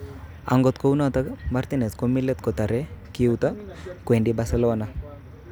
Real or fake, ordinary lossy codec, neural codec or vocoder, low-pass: real; none; none; none